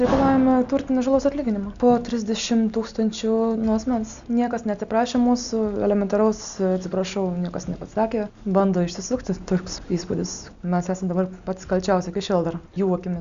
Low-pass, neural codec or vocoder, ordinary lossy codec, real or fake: 7.2 kHz; none; Opus, 64 kbps; real